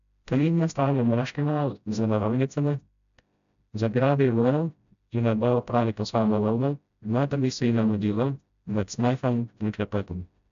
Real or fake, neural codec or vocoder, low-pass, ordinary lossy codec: fake; codec, 16 kHz, 0.5 kbps, FreqCodec, smaller model; 7.2 kHz; AAC, 96 kbps